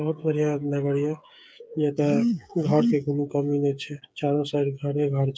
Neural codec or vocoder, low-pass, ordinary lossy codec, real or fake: codec, 16 kHz, 8 kbps, FreqCodec, smaller model; none; none; fake